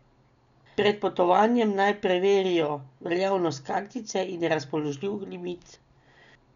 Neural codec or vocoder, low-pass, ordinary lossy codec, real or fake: none; 7.2 kHz; none; real